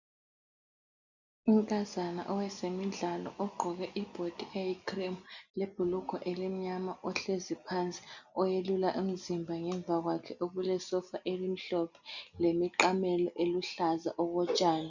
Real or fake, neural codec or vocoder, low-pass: real; none; 7.2 kHz